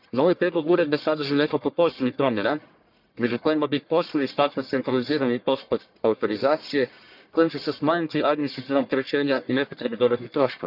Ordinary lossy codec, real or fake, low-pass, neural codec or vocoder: none; fake; 5.4 kHz; codec, 44.1 kHz, 1.7 kbps, Pupu-Codec